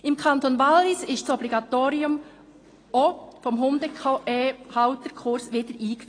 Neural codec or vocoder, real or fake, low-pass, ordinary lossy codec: none; real; 9.9 kHz; AAC, 32 kbps